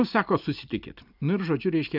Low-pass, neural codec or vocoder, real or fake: 5.4 kHz; none; real